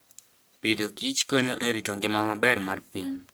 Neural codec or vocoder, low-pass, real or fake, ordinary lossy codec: codec, 44.1 kHz, 1.7 kbps, Pupu-Codec; none; fake; none